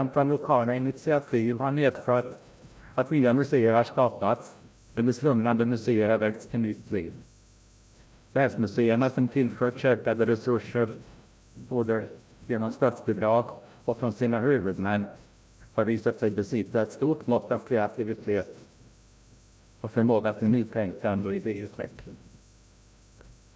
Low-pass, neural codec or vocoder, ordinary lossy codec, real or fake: none; codec, 16 kHz, 0.5 kbps, FreqCodec, larger model; none; fake